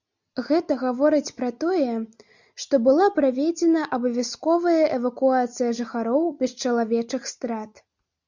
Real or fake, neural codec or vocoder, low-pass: real; none; 7.2 kHz